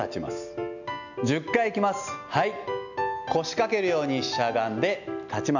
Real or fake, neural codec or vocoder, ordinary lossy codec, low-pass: real; none; none; 7.2 kHz